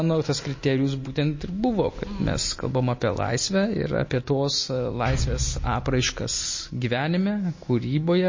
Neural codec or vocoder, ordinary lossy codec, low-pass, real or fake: none; MP3, 32 kbps; 7.2 kHz; real